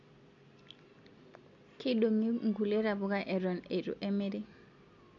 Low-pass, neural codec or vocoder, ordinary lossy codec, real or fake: 7.2 kHz; none; MP3, 48 kbps; real